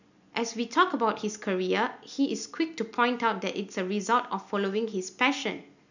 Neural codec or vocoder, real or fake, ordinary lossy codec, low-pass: none; real; none; 7.2 kHz